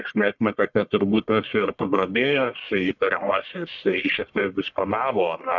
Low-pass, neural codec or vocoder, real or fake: 7.2 kHz; codec, 44.1 kHz, 1.7 kbps, Pupu-Codec; fake